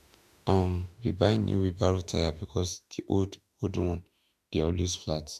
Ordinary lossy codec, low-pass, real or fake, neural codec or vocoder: none; 14.4 kHz; fake; autoencoder, 48 kHz, 32 numbers a frame, DAC-VAE, trained on Japanese speech